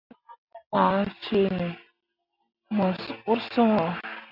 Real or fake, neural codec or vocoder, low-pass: real; none; 5.4 kHz